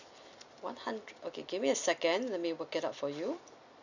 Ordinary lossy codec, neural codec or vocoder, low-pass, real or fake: none; none; 7.2 kHz; real